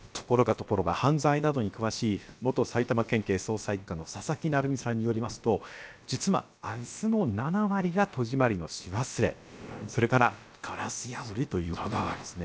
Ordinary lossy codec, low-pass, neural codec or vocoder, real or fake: none; none; codec, 16 kHz, about 1 kbps, DyCAST, with the encoder's durations; fake